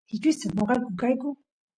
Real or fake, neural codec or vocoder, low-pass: real; none; 9.9 kHz